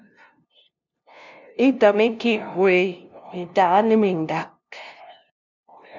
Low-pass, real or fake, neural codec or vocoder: 7.2 kHz; fake; codec, 16 kHz, 0.5 kbps, FunCodec, trained on LibriTTS, 25 frames a second